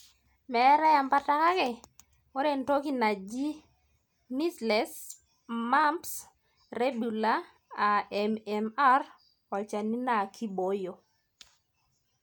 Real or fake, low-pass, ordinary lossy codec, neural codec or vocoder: real; none; none; none